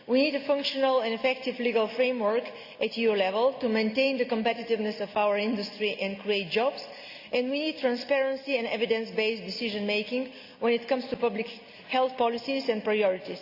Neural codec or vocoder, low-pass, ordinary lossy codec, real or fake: none; 5.4 kHz; Opus, 64 kbps; real